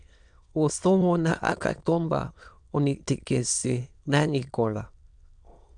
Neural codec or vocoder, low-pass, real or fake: autoencoder, 22.05 kHz, a latent of 192 numbers a frame, VITS, trained on many speakers; 9.9 kHz; fake